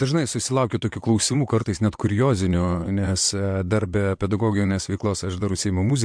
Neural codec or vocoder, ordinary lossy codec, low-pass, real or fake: vocoder, 22.05 kHz, 80 mel bands, WaveNeXt; MP3, 64 kbps; 9.9 kHz; fake